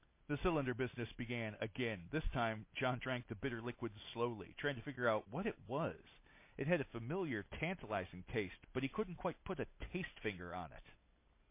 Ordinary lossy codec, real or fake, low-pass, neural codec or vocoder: MP3, 24 kbps; real; 3.6 kHz; none